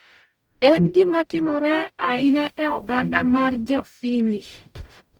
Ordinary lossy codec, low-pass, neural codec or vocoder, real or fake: Opus, 64 kbps; 19.8 kHz; codec, 44.1 kHz, 0.9 kbps, DAC; fake